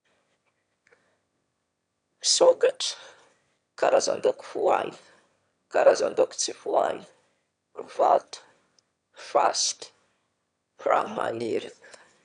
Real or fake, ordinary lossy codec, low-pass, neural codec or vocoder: fake; none; 9.9 kHz; autoencoder, 22.05 kHz, a latent of 192 numbers a frame, VITS, trained on one speaker